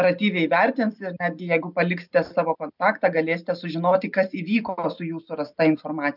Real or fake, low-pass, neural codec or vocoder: real; 5.4 kHz; none